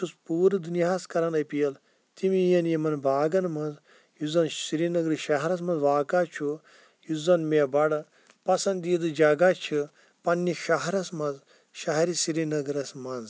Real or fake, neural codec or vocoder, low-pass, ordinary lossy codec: real; none; none; none